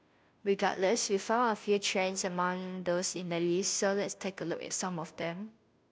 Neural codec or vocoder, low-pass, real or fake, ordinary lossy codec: codec, 16 kHz, 0.5 kbps, FunCodec, trained on Chinese and English, 25 frames a second; none; fake; none